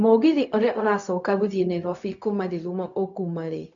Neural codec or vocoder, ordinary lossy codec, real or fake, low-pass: codec, 16 kHz, 0.4 kbps, LongCat-Audio-Codec; none; fake; 7.2 kHz